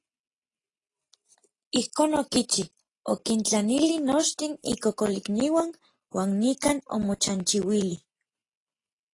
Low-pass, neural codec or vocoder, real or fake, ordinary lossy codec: 10.8 kHz; none; real; AAC, 32 kbps